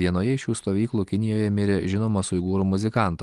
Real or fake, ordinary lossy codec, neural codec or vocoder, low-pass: real; Opus, 24 kbps; none; 10.8 kHz